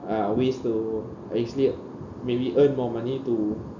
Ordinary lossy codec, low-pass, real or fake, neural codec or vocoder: none; 7.2 kHz; real; none